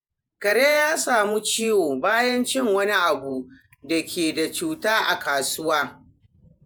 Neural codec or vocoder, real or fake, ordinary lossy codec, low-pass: vocoder, 48 kHz, 128 mel bands, Vocos; fake; none; none